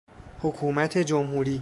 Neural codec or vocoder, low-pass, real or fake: codec, 44.1 kHz, 7.8 kbps, DAC; 10.8 kHz; fake